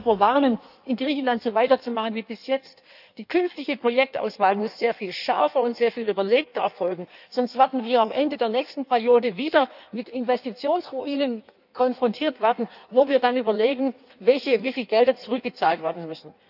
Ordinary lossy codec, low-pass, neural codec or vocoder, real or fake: none; 5.4 kHz; codec, 16 kHz in and 24 kHz out, 1.1 kbps, FireRedTTS-2 codec; fake